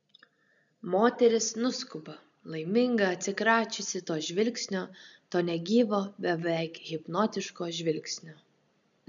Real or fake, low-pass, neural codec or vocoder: real; 7.2 kHz; none